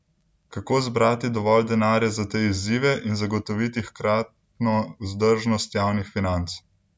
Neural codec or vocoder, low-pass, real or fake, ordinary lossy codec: none; none; real; none